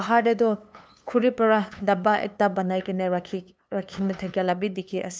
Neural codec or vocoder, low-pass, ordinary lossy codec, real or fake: codec, 16 kHz, 2 kbps, FunCodec, trained on LibriTTS, 25 frames a second; none; none; fake